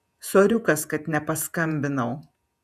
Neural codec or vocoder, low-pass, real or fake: vocoder, 48 kHz, 128 mel bands, Vocos; 14.4 kHz; fake